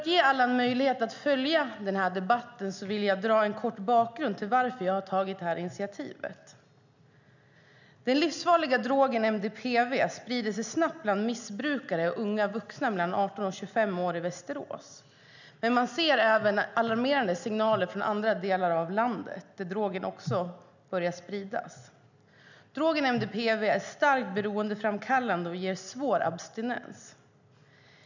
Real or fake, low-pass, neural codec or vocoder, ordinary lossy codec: real; 7.2 kHz; none; none